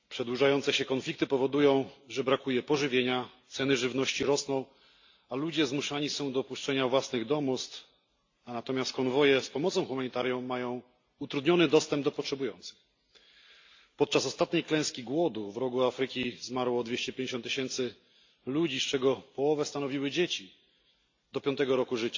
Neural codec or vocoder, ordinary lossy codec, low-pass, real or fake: none; AAC, 48 kbps; 7.2 kHz; real